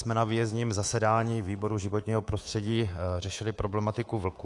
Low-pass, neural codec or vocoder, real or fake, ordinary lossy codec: 10.8 kHz; codec, 24 kHz, 3.1 kbps, DualCodec; fake; AAC, 48 kbps